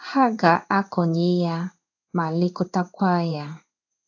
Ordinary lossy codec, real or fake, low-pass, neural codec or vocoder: AAC, 48 kbps; fake; 7.2 kHz; codec, 16 kHz in and 24 kHz out, 1 kbps, XY-Tokenizer